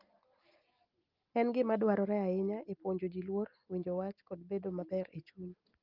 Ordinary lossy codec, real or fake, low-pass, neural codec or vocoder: Opus, 32 kbps; real; 5.4 kHz; none